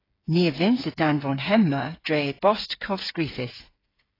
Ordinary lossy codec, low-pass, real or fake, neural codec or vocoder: AAC, 24 kbps; 5.4 kHz; fake; codec, 16 kHz, 8 kbps, FreqCodec, smaller model